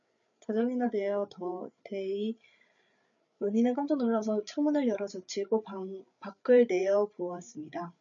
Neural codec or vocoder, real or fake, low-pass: codec, 16 kHz, 8 kbps, FreqCodec, larger model; fake; 7.2 kHz